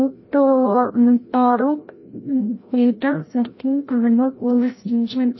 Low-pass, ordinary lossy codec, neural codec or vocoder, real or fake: 7.2 kHz; MP3, 24 kbps; codec, 16 kHz, 0.5 kbps, FreqCodec, larger model; fake